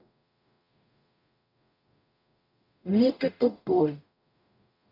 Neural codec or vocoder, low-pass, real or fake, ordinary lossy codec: codec, 44.1 kHz, 0.9 kbps, DAC; 5.4 kHz; fake; none